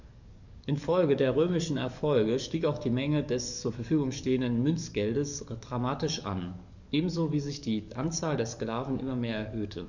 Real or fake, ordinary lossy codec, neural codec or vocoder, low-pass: fake; none; codec, 44.1 kHz, 7.8 kbps, DAC; 7.2 kHz